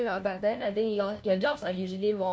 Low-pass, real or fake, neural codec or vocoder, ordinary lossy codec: none; fake; codec, 16 kHz, 1 kbps, FunCodec, trained on LibriTTS, 50 frames a second; none